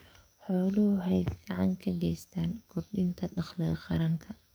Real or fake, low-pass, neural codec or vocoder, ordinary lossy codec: fake; none; codec, 44.1 kHz, 7.8 kbps, DAC; none